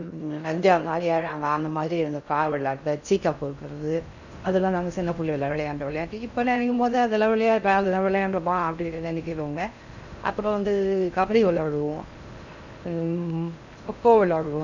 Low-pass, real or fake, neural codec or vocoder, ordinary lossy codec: 7.2 kHz; fake; codec, 16 kHz in and 24 kHz out, 0.6 kbps, FocalCodec, streaming, 4096 codes; none